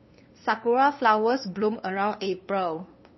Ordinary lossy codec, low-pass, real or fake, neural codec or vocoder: MP3, 24 kbps; 7.2 kHz; fake; codec, 16 kHz, 2 kbps, FunCodec, trained on LibriTTS, 25 frames a second